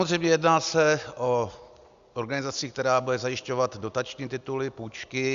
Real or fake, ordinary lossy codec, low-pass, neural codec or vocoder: real; Opus, 64 kbps; 7.2 kHz; none